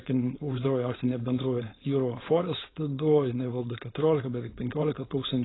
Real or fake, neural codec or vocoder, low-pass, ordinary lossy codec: fake; codec, 16 kHz, 4.8 kbps, FACodec; 7.2 kHz; AAC, 16 kbps